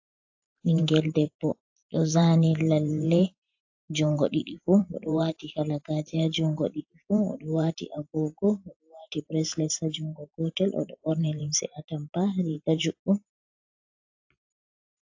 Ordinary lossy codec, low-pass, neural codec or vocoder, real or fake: AAC, 48 kbps; 7.2 kHz; vocoder, 44.1 kHz, 128 mel bands every 512 samples, BigVGAN v2; fake